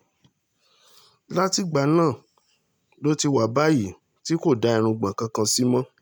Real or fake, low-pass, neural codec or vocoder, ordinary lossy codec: real; none; none; none